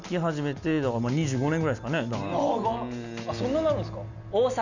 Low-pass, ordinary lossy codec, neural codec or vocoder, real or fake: 7.2 kHz; none; none; real